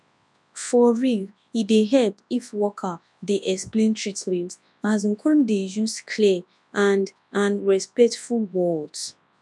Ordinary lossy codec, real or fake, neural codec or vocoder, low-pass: none; fake; codec, 24 kHz, 0.9 kbps, WavTokenizer, large speech release; none